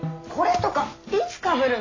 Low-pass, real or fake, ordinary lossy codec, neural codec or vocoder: 7.2 kHz; real; AAC, 32 kbps; none